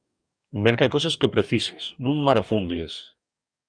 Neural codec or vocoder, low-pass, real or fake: codec, 44.1 kHz, 2.6 kbps, DAC; 9.9 kHz; fake